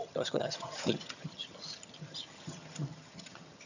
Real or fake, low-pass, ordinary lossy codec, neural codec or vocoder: fake; 7.2 kHz; none; vocoder, 22.05 kHz, 80 mel bands, HiFi-GAN